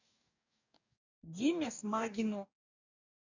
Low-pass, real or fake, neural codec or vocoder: 7.2 kHz; fake; codec, 44.1 kHz, 2.6 kbps, DAC